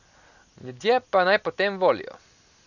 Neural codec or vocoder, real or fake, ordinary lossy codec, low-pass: none; real; none; 7.2 kHz